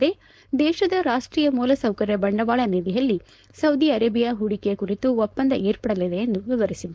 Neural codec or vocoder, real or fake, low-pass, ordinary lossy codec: codec, 16 kHz, 4.8 kbps, FACodec; fake; none; none